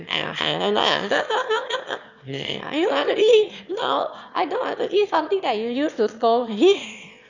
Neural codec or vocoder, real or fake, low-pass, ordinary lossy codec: autoencoder, 22.05 kHz, a latent of 192 numbers a frame, VITS, trained on one speaker; fake; 7.2 kHz; none